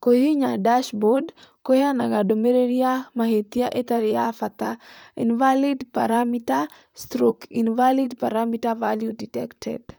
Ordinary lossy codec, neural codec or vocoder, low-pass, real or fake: none; vocoder, 44.1 kHz, 128 mel bands, Pupu-Vocoder; none; fake